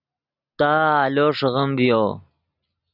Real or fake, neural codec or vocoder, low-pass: real; none; 5.4 kHz